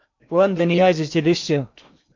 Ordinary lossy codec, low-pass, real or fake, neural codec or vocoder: MP3, 48 kbps; 7.2 kHz; fake; codec, 16 kHz in and 24 kHz out, 0.8 kbps, FocalCodec, streaming, 65536 codes